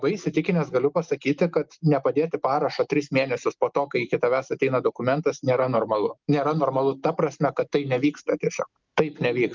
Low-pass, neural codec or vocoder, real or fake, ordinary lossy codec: 7.2 kHz; none; real; Opus, 24 kbps